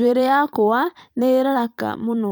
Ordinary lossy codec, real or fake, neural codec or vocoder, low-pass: none; fake; vocoder, 44.1 kHz, 128 mel bands every 512 samples, BigVGAN v2; none